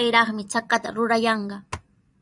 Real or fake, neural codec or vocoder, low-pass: fake; vocoder, 44.1 kHz, 128 mel bands every 256 samples, BigVGAN v2; 10.8 kHz